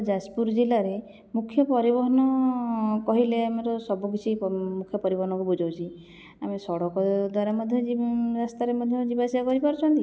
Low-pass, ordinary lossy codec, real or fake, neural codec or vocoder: none; none; real; none